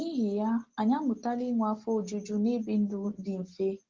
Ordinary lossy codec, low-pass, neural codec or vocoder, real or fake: Opus, 16 kbps; 7.2 kHz; none; real